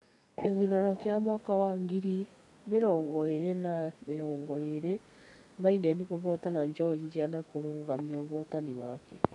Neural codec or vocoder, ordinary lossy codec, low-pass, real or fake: codec, 32 kHz, 1.9 kbps, SNAC; none; 10.8 kHz; fake